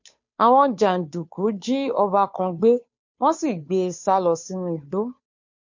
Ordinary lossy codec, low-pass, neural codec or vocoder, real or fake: MP3, 48 kbps; 7.2 kHz; codec, 16 kHz, 2 kbps, FunCodec, trained on Chinese and English, 25 frames a second; fake